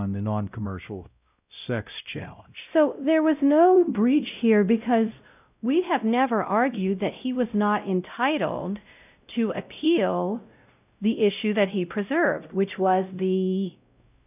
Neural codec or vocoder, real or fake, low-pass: codec, 16 kHz, 0.5 kbps, X-Codec, WavLM features, trained on Multilingual LibriSpeech; fake; 3.6 kHz